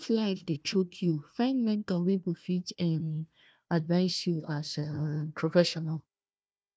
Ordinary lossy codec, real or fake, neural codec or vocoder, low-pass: none; fake; codec, 16 kHz, 1 kbps, FunCodec, trained on Chinese and English, 50 frames a second; none